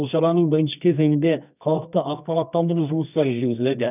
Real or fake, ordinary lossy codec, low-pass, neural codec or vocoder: fake; none; 3.6 kHz; codec, 24 kHz, 0.9 kbps, WavTokenizer, medium music audio release